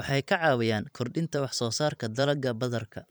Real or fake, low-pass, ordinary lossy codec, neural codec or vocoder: fake; none; none; vocoder, 44.1 kHz, 128 mel bands every 512 samples, BigVGAN v2